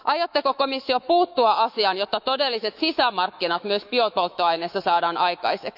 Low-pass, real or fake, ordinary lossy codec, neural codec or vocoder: 5.4 kHz; fake; none; autoencoder, 48 kHz, 128 numbers a frame, DAC-VAE, trained on Japanese speech